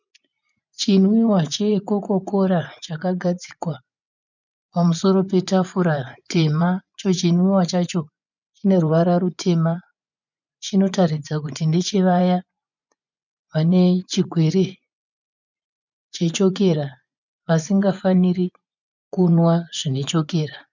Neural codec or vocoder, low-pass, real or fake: vocoder, 24 kHz, 100 mel bands, Vocos; 7.2 kHz; fake